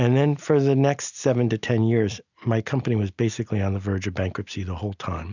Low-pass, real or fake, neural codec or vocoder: 7.2 kHz; real; none